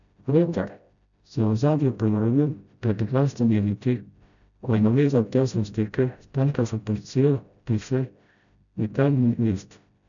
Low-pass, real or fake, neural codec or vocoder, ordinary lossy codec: 7.2 kHz; fake; codec, 16 kHz, 0.5 kbps, FreqCodec, smaller model; none